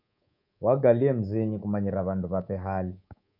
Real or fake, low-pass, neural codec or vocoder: fake; 5.4 kHz; codec, 24 kHz, 3.1 kbps, DualCodec